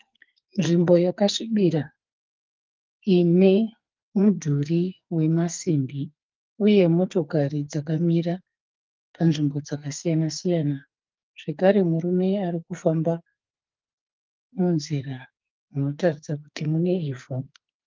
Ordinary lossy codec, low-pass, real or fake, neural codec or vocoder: Opus, 24 kbps; 7.2 kHz; fake; codec, 44.1 kHz, 2.6 kbps, SNAC